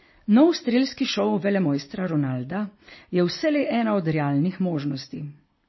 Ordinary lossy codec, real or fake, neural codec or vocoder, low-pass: MP3, 24 kbps; real; none; 7.2 kHz